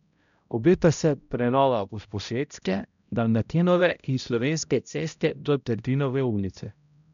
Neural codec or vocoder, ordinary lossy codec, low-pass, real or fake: codec, 16 kHz, 0.5 kbps, X-Codec, HuBERT features, trained on balanced general audio; none; 7.2 kHz; fake